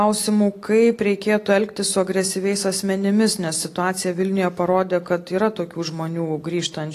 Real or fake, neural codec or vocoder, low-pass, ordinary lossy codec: real; none; 14.4 kHz; AAC, 48 kbps